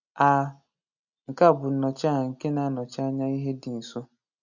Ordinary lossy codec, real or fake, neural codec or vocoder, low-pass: none; real; none; 7.2 kHz